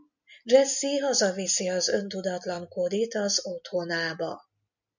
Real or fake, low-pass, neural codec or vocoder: real; 7.2 kHz; none